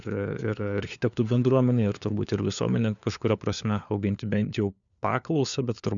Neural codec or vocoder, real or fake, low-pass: codec, 16 kHz, 4 kbps, FunCodec, trained on LibriTTS, 50 frames a second; fake; 7.2 kHz